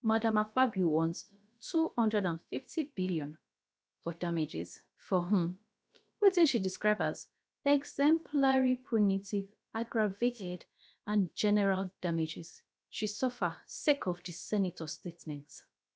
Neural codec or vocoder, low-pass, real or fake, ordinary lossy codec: codec, 16 kHz, about 1 kbps, DyCAST, with the encoder's durations; none; fake; none